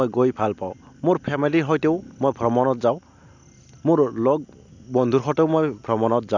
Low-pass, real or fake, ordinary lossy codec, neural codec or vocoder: 7.2 kHz; real; none; none